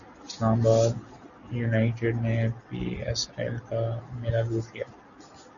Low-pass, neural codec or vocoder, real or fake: 7.2 kHz; none; real